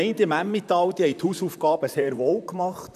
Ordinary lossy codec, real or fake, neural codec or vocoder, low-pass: none; fake; vocoder, 44.1 kHz, 128 mel bands, Pupu-Vocoder; 14.4 kHz